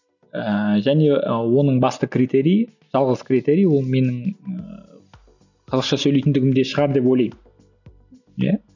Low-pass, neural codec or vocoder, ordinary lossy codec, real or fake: 7.2 kHz; none; none; real